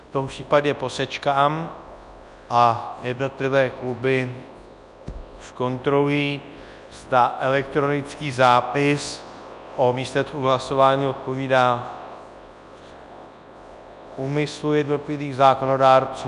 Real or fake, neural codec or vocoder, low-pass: fake; codec, 24 kHz, 0.9 kbps, WavTokenizer, large speech release; 10.8 kHz